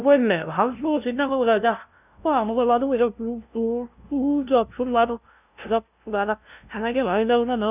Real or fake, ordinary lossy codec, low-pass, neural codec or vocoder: fake; none; 3.6 kHz; codec, 16 kHz, 0.5 kbps, FunCodec, trained on LibriTTS, 25 frames a second